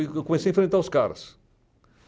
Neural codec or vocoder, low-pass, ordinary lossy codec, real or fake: none; none; none; real